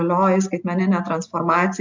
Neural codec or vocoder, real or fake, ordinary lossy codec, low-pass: none; real; MP3, 64 kbps; 7.2 kHz